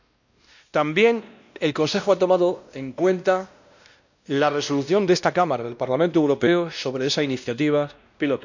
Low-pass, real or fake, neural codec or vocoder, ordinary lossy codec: 7.2 kHz; fake; codec, 16 kHz, 1 kbps, X-Codec, WavLM features, trained on Multilingual LibriSpeech; none